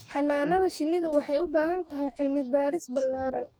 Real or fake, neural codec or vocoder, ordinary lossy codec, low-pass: fake; codec, 44.1 kHz, 2.6 kbps, DAC; none; none